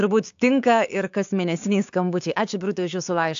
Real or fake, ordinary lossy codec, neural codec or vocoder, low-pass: fake; AAC, 64 kbps; codec, 16 kHz, 6 kbps, DAC; 7.2 kHz